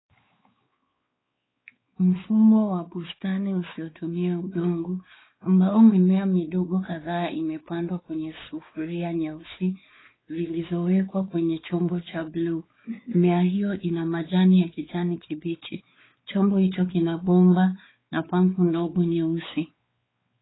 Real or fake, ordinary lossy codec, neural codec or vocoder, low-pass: fake; AAC, 16 kbps; codec, 16 kHz, 4 kbps, X-Codec, WavLM features, trained on Multilingual LibriSpeech; 7.2 kHz